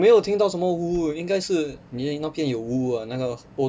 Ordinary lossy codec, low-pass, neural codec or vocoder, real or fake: none; none; none; real